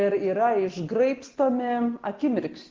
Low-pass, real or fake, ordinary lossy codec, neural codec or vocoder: 7.2 kHz; real; Opus, 16 kbps; none